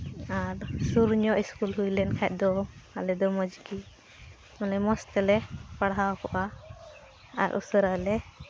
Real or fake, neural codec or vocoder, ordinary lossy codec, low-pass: real; none; none; none